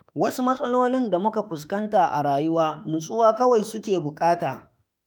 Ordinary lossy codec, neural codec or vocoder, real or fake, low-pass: none; autoencoder, 48 kHz, 32 numbers a frame, DAC-VAE, trained on Japanese speech; fake; none